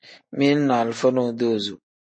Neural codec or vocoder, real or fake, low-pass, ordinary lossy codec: none; real; 9.9 kHz; MP3, 32 kbps